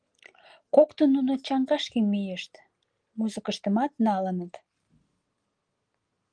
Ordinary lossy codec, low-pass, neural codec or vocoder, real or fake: Opus, 24 kbps; 9.9 kHz; none; real